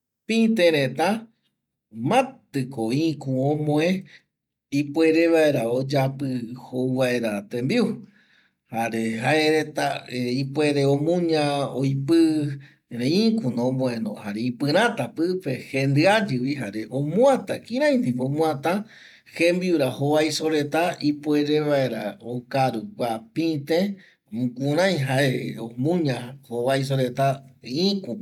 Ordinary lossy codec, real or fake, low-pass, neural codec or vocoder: none; real; 19.8 kHz; none